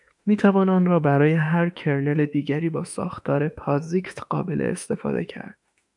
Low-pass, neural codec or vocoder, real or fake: 10.8 kHz; autoencoder, 48 kHz, 32 numbers a frame, DAC-VAE, trained on Japanese speech; fake